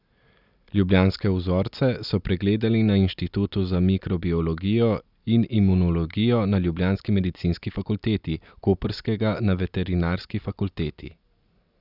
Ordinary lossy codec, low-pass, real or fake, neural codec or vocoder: none; 5.4 kHz; real; none